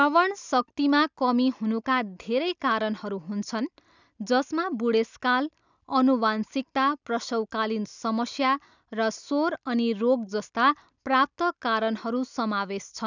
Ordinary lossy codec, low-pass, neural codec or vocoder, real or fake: none; 7.2 kHz; none; real